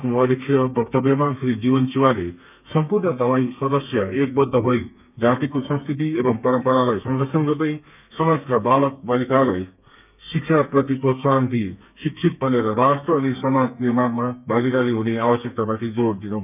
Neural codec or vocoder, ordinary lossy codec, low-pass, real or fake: codec, 32 kHz, 1.9 kbps, SNAC; none; 3.6 kHz; fake